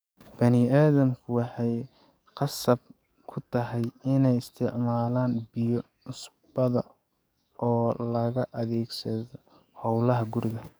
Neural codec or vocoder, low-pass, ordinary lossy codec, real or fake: codec, 44.1 kHz, 7.8 kbps, DAC; none; none; fake